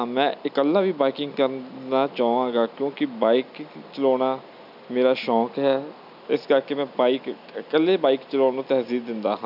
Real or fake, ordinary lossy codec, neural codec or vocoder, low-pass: real; none; none; 5.4 kHz